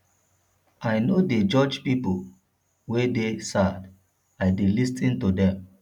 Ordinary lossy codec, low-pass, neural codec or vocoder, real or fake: none; 19.8 kHz; none; real